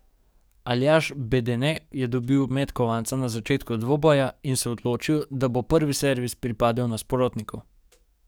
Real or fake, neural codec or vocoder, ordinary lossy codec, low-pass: fake; codec, 44.1 kHz, 7.8 kbps, DAC; none; none